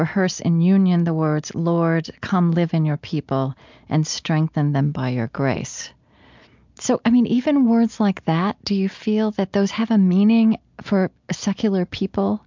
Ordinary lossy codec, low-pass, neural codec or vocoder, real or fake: MP3, 64 kbps; 7.2 kHz; none; real